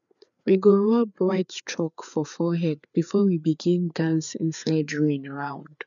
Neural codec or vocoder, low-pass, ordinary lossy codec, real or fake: codec, 16 kHz, 4 kbps, FreqCodec, larger model; 7.2 kHz; none; fake